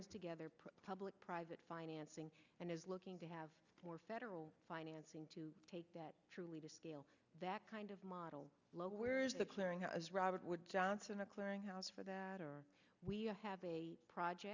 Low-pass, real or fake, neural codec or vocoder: 7.2 kHz; real; none